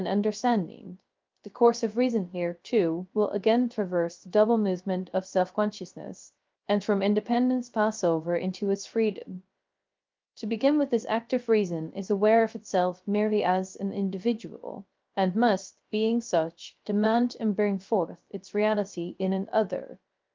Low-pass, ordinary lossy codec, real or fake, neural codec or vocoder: 7.2 kHz; Opus, 32 kbps; fake; codec, 16 kHz, 0.3 kbps, FocalCodec